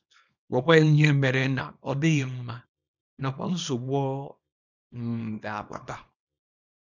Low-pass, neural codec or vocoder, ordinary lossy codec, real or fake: 7.2 kHz; codec, 24 kHz, 0.9 kbps, WavTokenizer, small release; none; fake